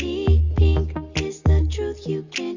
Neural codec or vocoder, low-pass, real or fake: none; 7.2 kHz; real